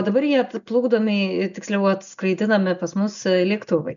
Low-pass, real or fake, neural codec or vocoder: 7.2 kHz; real; none